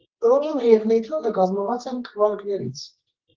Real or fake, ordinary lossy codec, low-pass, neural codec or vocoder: fake; Opus, 16 kbps; 7.2 kHz; codec, 24 kHz, 0.9 kbps, WavTokenizer, medium music audio release